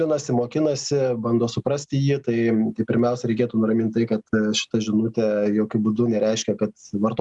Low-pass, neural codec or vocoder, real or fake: 10.8 kHz; none; real